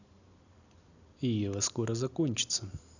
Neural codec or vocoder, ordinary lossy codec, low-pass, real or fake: none; none; 7.2 kHz; real